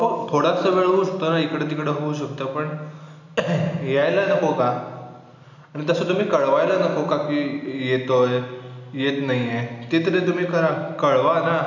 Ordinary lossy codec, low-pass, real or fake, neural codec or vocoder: none; 7.2 kHz; real; none